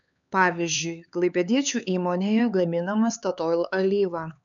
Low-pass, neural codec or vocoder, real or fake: 7.2 kHz; codec, 16 kHz, 4 kbps, X-Codec, HuBERT features, trained on LibriSpeech; fake